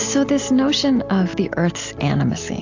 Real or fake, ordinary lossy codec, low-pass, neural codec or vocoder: real; AAC, 48 kbps; 7.2 kHz; none